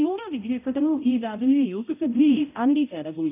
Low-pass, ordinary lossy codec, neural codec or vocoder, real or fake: 3.6 kHz; none; codec, 16 kHz, 0.5 kbps, X-Codec, HuBERT features, trained on balanced general audio; fake